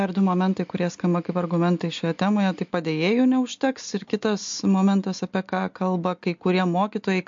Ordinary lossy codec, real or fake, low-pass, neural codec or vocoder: MP3, 48 kbps; real; 7.2 kHz; none